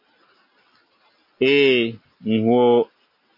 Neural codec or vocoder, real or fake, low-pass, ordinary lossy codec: none; real; 5.4 kHz; MP3, 32 kbps